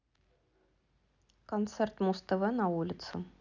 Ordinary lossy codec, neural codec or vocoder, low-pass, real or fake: none; none; 7.2 kHz; real